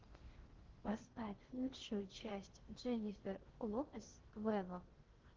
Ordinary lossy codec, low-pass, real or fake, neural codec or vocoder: Opus, 16 kbps; 7.2 kHz; fake; codec, 16 kHz in and 24 kHz out, 0.6 kbps, FocalCodec, streaming, 4096 codes